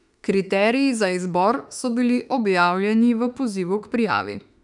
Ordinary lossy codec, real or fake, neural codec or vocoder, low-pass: none; fake; autoencoder, 48 kHz, 32 numbers a frame, DAC-VAE, trained on Japanese speech; 10.8 kHz